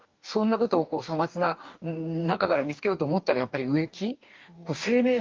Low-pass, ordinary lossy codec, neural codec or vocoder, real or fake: 7.2 kHz; Opus, 24 kbps; codec, 44.1 kHz, 2.6 kbps, DAC; fake